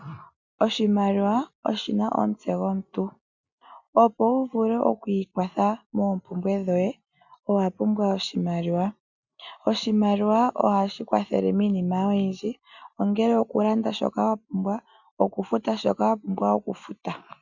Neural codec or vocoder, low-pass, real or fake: none; 7.2 kHz; real